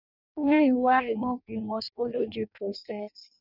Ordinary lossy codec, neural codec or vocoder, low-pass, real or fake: none; codec, 16 kHz in and 24 kHz out, 0.6 kbps, FireRedTTS-2 codec; 5.4 kHz; fake